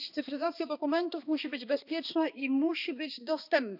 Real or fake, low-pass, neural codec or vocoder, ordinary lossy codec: fake; 5.4 kHz; codec, 16 kHz, 2 kbps, X-Codec, HuBERT features, trained on balanced general audio; none